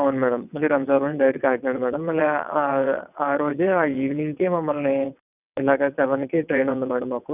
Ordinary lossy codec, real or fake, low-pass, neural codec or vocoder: none; fake; 3.6 kHz; vocoder, 22.05 kHz, 80 mel bands, WaveNeXt